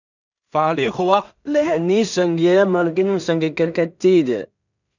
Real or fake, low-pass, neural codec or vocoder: fake; 7.2 kHz; codec, 16 kHz in and 24 kHz out, 0.4 kbps, LongCat-Audio-Codec, two codebook decoder